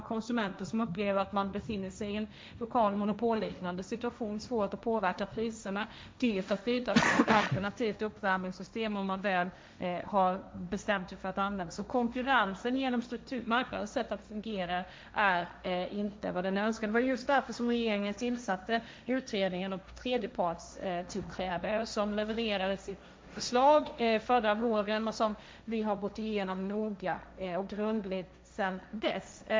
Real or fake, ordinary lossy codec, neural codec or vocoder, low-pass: fake; none; codec, 16 kHz, 1.1 kbps, Voila-Tokenizer; none